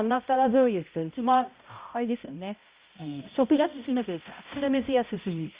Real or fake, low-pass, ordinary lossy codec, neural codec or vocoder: fake; 3.6 kHz; Opus, 64 kbps; codec, 16 kHz, 0.5 kbps, X-Codec, HuBERT features, trained on balanced general audio